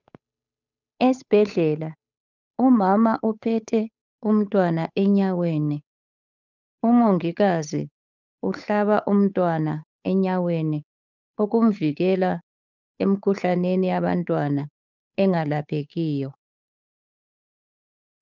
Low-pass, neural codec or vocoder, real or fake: 7.2 kHz; codec, 16 kHz, 8 kbps, FunCodec, trained on Chinese and English, 25 frames a second; fake